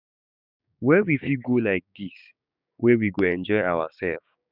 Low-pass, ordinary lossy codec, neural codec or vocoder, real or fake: 5.4 kHz; none; codec, 16 kHz, 6 kbps, DAC; fake